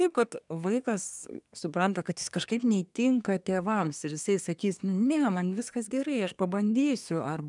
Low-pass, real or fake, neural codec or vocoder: 10.8 kHz; fake; codec, 24 kHz, 1 kbps, SNAC